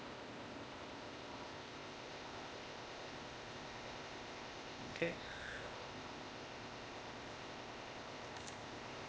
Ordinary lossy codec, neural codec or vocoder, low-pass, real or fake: none; codec, 16 kHz, 0.8 kbps, ZipCodec; none; fake